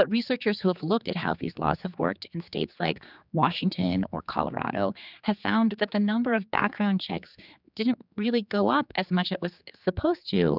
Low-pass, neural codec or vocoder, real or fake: 5.4 kHz; codec, 16 kHz, 4 kbps, X-Codec, HuBERT features, trained on general audio; fake